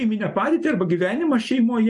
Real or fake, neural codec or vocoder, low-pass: real; none; 10.8 kHz